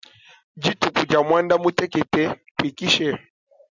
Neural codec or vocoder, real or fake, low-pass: none; real; 7.2 kHz